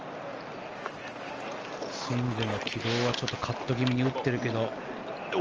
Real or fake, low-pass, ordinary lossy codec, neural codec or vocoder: real; 7.2 kHz; Opus, 24 kbps; none